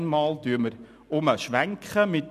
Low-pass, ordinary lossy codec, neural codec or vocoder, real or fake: 14.4 kHz; none; none; real